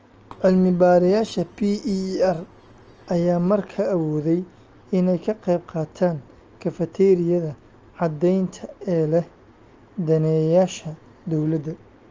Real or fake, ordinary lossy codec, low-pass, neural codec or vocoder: real; Opus, 24 kbps; 7.2 kHz; none